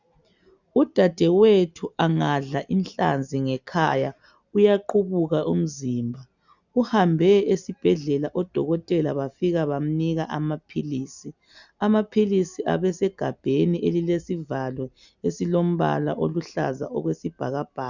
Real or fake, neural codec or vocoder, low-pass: real; none; 7.2 kHz